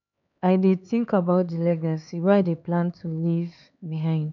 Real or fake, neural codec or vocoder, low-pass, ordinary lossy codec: fake; codec, 16 kHz, 4 kbps, X-Codec, HuBERT features, trained on LibriSpeech; 7.2 kHz; none